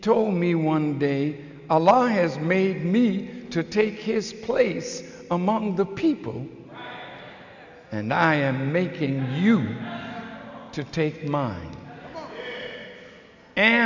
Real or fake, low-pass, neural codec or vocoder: real; 7.2 kHz; none